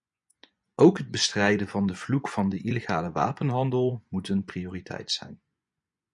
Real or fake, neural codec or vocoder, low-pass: fake; vocoder, 24 kHz, 100 mel bands, Vocos; 10.8 kHz